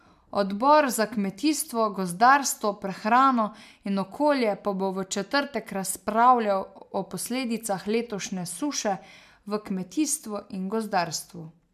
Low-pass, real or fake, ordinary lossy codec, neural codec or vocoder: 14.4 kHz; real; MP3, 96 kbps; none